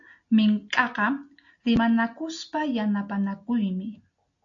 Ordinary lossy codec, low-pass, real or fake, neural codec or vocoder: AAC, 48 kbps; 7.2 kHz; real; none